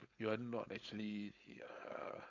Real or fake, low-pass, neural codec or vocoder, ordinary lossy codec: fake; 7.2 kHz; codec, 16 kHz, 4.8 kbps, FACodec; none